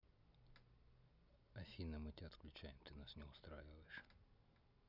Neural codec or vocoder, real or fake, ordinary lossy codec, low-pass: none; real; none; 5.4 kHz